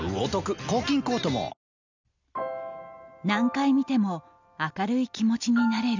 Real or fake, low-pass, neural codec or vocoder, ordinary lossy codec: real; 7.2 kHz; none; none